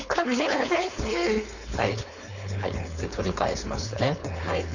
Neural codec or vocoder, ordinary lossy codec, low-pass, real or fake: codec, 16 kHz, 4.8 kbps, FACodec; none; 7.2 kHz; fake